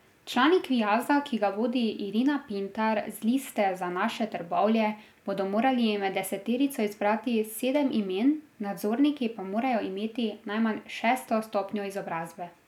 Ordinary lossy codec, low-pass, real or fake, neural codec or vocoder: none; 19.8 kHz; real; none